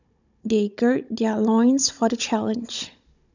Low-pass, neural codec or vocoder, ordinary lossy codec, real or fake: 7.2 kHz; codec, 16 kHz, 16 kbps, FunCodec, trained on Chinese and English, 50 frames a second; none; fake